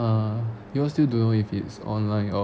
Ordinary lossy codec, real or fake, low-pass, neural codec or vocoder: none; real; none; none